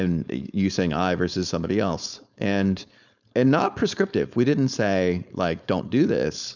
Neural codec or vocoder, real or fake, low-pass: codec, 16 kHz, 4.8 kbps, FACodec; fake; 7.2 kHz